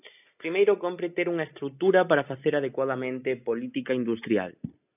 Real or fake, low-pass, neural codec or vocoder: real; 3.6 kHz; none